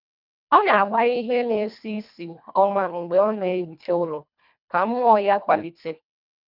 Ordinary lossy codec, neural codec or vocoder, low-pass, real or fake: none; codec, 24 kHz, 1.5 kbps, HILCodec; 5.4 kHz; fake